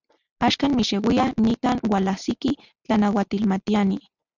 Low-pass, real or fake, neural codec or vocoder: 7.2 kHz; real; none